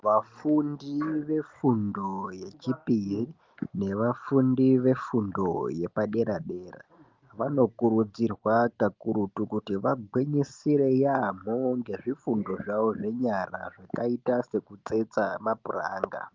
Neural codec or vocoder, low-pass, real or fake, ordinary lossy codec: none; 7.2 kHz; real; Opus, 32 kbps